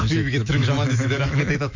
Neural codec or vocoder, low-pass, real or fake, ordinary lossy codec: autoencoder, 48 kHz, 128 numbers a frame, DAC-VAE, trained on Japanese speech; 7.2 kHz; fake; MP3, 64 kbps